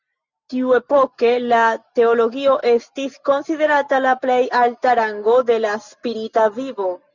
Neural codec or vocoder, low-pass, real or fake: none; 7.2 kHz; real